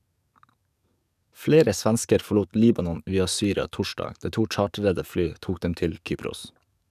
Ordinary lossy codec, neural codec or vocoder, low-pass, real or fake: none; codec, 44.1 kHz, 7.8 kbps, DAC; 14.4 kHz; fake